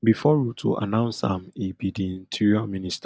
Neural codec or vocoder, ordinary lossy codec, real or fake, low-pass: none; none; real; none